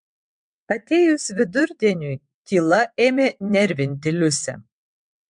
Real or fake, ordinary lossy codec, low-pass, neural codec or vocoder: fake; MP3, 64 kbps; 9.9 kHz; vocoder, 22.05 kHz, 80 mel bands, WaveNeXt